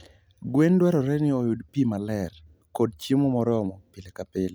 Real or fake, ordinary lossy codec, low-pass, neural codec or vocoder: real; none; none; none